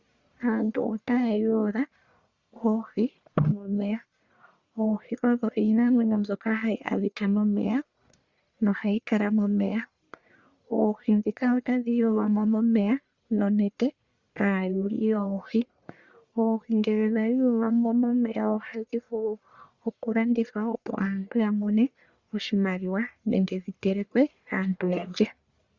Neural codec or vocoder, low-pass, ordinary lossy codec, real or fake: codec, 44.1 kHz, 1.7 kbps, Pupu-Codec; 7.2 kHz; Opus, 64 kbps; fake